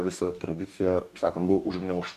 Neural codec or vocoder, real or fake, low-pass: codec, 44.1 kHz, 2.6 kbps, DAC; fake; 14.4 kHz